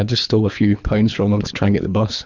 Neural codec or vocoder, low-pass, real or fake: codec, 24 kHz, 3 kbps, HILCodec; 7.2 kHz; fake